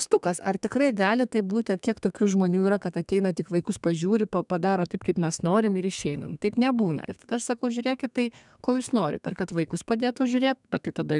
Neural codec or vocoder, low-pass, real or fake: codec, 32 kHz, 1.9 kbps, SNAC; 10.8 kHz; fake